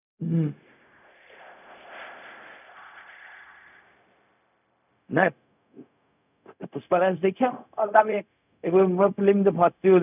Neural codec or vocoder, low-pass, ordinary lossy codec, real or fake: codec, 16 kHz, 0.4 kbps, LongCat-Audio-Codec; 3.6 kHz; none; fake